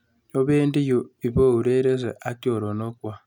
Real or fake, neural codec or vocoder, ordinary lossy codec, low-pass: real; none; none; 19.8 kHz